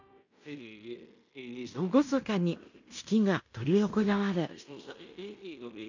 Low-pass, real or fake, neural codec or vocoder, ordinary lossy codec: 7.2 kHz; fake; codec, 16 kHz in and 24 kHz out, 0.9 kbps, LongCat-Audio-Codec, fine tuned four codebook decoder; none